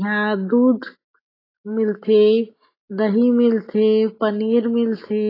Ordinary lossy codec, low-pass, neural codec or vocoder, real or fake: AAC, 24 kbps; 5.4 kHz; none; real